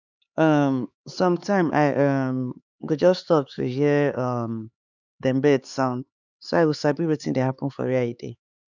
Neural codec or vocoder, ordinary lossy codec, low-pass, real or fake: codec, 16 kHz, 4 kbps, X-Codec, HuBERT features, trained on LibriSpeech; none; 7.2 kHz; fake